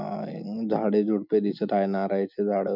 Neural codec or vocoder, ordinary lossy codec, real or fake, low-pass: none; none; real; 5.4 kHz